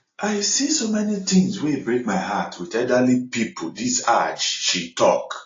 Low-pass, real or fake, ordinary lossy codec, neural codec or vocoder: 7.2 kHz; real; AAC, 32 kbps; none